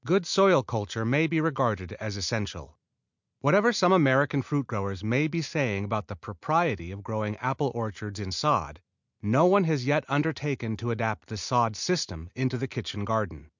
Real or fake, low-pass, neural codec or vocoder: real; 7.2 kHz; none